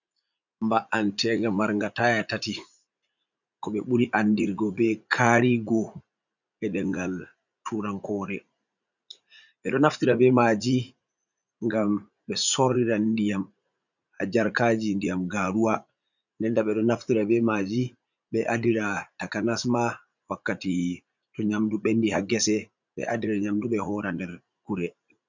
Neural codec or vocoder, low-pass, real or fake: vocoder, 44.1 kHz, 80 mel bands, Vocos; 7.2 kHz; fake